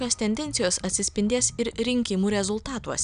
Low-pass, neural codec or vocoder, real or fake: 9.9 kHz; none; real